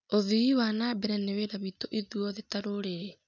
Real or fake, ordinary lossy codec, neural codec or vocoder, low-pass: real; none; none; 7.2 kHz